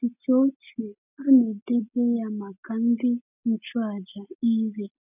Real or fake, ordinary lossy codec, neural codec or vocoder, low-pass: real; none; none; 3.6 kHz